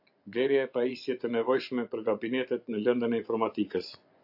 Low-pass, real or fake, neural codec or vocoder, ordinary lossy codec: 5.4 kHz; fake; vocoder, 24 kHz, 100 mel bands, Vocos; AAC, 48 kbps